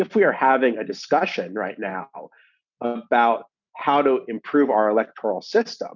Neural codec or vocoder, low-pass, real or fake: none; 7.2 kHz; real